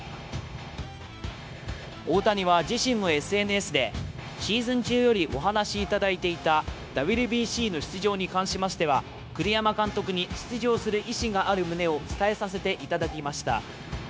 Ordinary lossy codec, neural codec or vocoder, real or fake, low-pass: none; codec, 16 kHz, 0.9 kbps, LongCat-Audio-Codec; fake; none